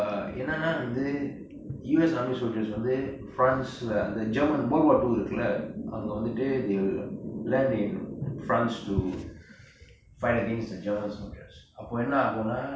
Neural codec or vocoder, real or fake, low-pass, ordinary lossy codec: none; real; none; none